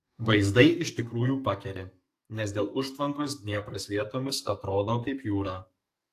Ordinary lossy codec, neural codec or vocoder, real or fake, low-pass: AAC, 64 kbps; codec, 44.1 kHz, 2.6 kbps, SNAC; fake; 14.4 kHz